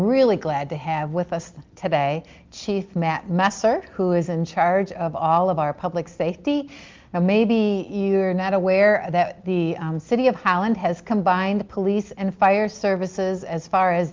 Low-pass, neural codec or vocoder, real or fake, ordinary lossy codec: 7.2 kHz; none; real; Opus, 32 kbps